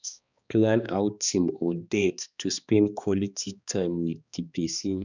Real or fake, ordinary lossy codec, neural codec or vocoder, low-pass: fake; none; codec, 16 kHz, 2 kbps, X-Codec, HuBERT features, trained on balanced general audio; 7.2 kHz